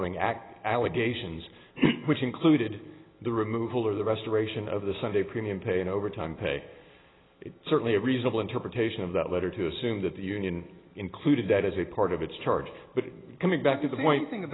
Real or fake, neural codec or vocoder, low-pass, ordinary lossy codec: real; none; 7.2 kHz; AAC, 16 kbps